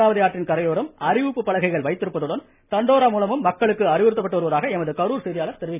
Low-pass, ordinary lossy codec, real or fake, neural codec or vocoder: 3.6 kHz; none; real; none